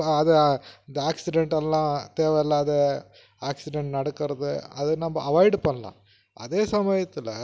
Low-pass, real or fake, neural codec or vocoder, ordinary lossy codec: none; real; none; none